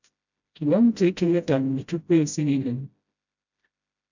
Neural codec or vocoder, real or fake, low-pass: codec, 16 kHz, 0.5 kbps, FreqCodec, smaller model; fake; 7.2 kHz